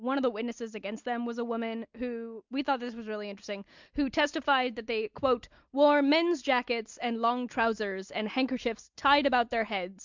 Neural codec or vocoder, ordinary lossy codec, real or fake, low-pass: none; MP3, 64 kbps; real; 7.2 kHz